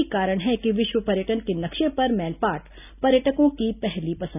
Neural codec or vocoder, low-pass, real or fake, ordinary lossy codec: none; 3.6 kHz; real; none